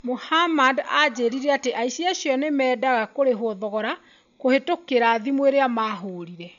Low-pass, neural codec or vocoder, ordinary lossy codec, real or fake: 7.2 kHz; none; none; real